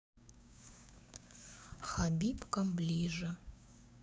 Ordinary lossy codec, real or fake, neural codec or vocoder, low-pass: none; fake; codec, 16 kHz, 6 kbps, DAC; none